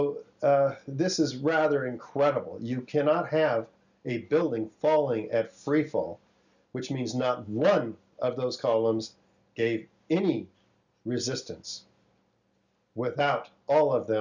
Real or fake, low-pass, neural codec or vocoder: real; 7.2 kHz; none